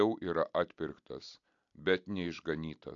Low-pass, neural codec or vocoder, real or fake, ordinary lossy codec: 7.2 kHz; none; real; AAC, 64 kbps